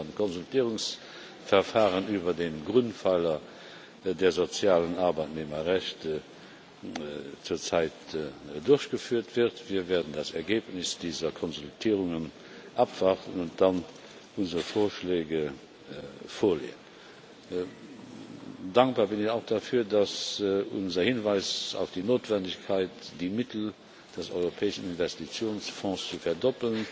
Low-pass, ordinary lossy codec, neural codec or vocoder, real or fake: none; none; none; real